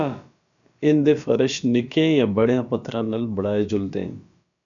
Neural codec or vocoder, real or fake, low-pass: codec, 16 kHz, about 1 kbps, DyCAST, with the encoder's durations; fake; 7.2 kHz